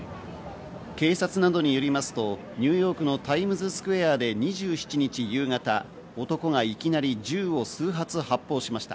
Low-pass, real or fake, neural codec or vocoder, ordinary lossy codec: none; real; none; none